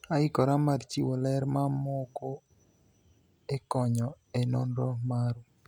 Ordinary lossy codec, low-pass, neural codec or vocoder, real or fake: none; 19.8 kHz; none; real